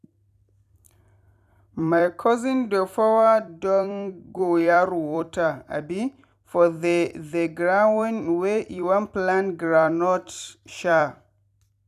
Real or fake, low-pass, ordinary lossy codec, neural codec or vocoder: fake; 14.4 kHz; none; vocoder, 44.1 kHz, 128 mel bands every 256 samples, BigVGAN v2